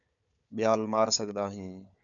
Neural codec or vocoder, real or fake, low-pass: codec, 16 kHz, 4 kbps, FunCodec, trained on Chinese and English, 50 frames a second; fake; 7.2 kHz